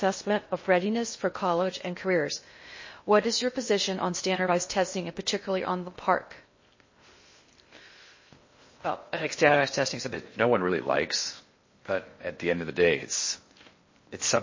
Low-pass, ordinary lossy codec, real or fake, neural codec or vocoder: 7.2 kHz; MP3, 32 kbps; fake; codec, 16 kHz in and 24 kHz out, 0.6 kbps, FocalCodec, streaming, 2048 codes